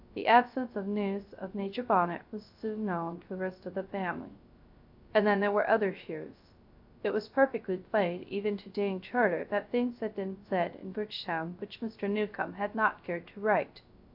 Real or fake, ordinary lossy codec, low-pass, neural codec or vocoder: fake; AAC, 48 kbps; 5.4 kHz; codec, 16 kHz, 0.3 kbps, FocalCodec